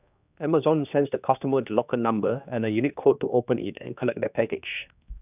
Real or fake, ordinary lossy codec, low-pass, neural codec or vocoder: fake; none; 3.6 kHz; codec, 16 kHz, 2 kbps, X-Codec, HuBERT features, trained on general audio